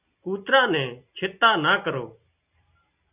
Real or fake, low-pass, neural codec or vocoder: real; 3.6 kHz; none